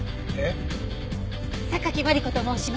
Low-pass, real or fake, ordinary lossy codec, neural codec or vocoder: none; real; none; none